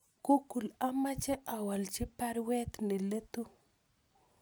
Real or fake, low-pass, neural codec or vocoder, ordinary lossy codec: real; none; none; none